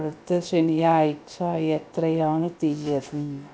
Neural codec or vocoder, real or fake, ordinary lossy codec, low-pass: codec, 16 kHz, about 1 kbps, DyCAST, with the encoder's durations; fake; none; none